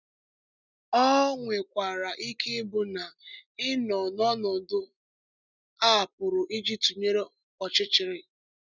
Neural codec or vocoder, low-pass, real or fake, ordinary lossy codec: none; 7.2 kHz; real; none